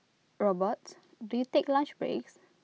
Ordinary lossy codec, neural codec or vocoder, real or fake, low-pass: none; none; real; none